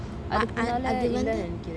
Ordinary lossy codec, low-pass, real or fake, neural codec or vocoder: none; none; real; none